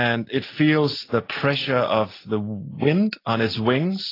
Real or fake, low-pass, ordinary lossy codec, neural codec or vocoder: real; 5.4 kHz; AAC, 24 kbps; none